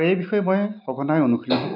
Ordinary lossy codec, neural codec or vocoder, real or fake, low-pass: none; none; real; 5.4 kHz